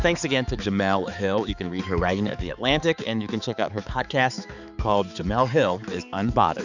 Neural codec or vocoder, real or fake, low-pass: codec, 16 kHz, 4 kbps, X-Codec, HuBERT features, trained on balanced general audio; fake; 7.2 kHz